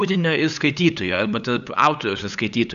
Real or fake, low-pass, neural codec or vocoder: fake; 7.2 kHz; codec, 16 kHz, 8 kbps, FunCodec, trained on LibriTTS, 25 frames a second